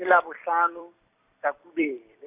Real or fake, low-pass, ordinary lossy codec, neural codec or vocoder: fake; 3.6 kHz; none; vocoder, 44.1 kHz, 128 mel bands every 256 samples, BigVGAN v2